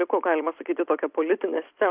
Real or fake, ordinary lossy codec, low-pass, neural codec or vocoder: real; Opus, 24 kbps; 3.6 kHz; none